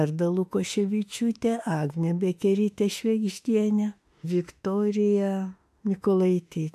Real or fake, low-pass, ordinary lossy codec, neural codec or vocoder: fake; 14.4 kHz; AAC, 96 kbps; autoencoder, 48 kHz, 32 numbers a frame, DAC-VAE, trained on Japanese speech